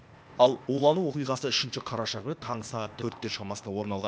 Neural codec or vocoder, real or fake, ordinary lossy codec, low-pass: codec, 16 kHz, 0.8 kbps, ZipCodec; fake; none; none